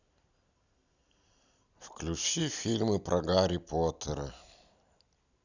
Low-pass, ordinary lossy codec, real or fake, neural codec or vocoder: 7.2 kHz; none; real; none